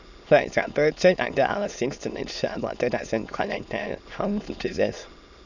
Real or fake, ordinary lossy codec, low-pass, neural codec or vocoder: fake; none; 7.2 kHz; autoencoder, 22.05 kHz, a latent of 192 numbers a frame, VITS, trained on many speakers